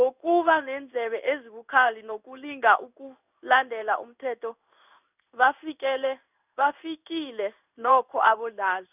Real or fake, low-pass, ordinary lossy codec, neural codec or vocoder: fake; 3.6 kHz; none; codec, 16 kHz in and 24 kHz out, 1 kbps, XY-Tokenizer